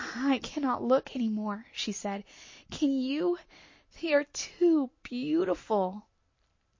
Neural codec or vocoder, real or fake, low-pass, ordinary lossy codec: none; real; 7.2 kHz; MP3, 32 kbps